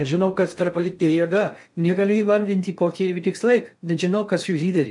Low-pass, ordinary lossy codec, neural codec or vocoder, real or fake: 10.8 kHz; MP3, 64 kbps; codec, 16 kHz in and 24 kHz out, 0.6 kbps, FocalCodec, streaming, 4096 codes; fake